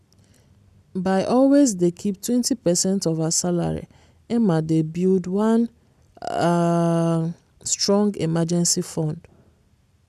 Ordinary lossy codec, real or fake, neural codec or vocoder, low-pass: none; real; none; 14.4 kHz